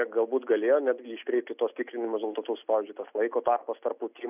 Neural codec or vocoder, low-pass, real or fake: none; 3.6 kHz; real